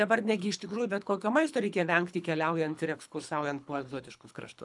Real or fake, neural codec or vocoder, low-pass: fake; codec, 24 kHz, 3 kbps, HILCodec; 10.8 kHz